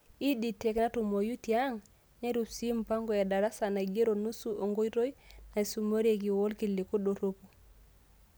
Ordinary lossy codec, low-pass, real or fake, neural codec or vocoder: none; none; real; none